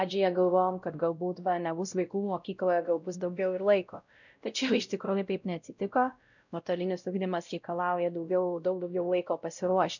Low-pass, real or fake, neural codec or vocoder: 7.2 kHz; fake; codec, 16 kHz, 0.5 kbps, X-Codec, WavLM features, trained on Multilingual LibriSpeech